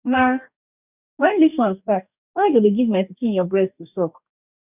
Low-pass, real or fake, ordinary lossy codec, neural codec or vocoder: 3.6 kHz; fake; none; codec, 44.1 kHz, 2.6 kbps, DAC